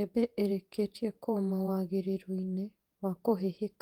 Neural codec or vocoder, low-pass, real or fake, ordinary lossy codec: vocoder, 48 kHz, 128 mel bands, Vocos; 19.8 kHz; fake; Opus, 32 kbps